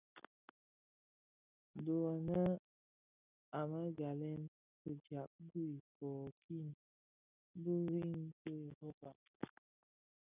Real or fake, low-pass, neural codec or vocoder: real; 3.6 kHz; none